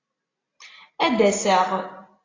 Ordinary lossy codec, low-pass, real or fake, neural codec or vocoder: AAC, 32 kbps; 7.2 kHz; real; none